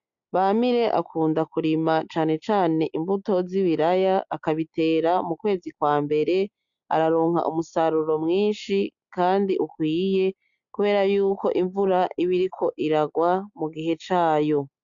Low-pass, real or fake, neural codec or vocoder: 7.2 kHz; real; none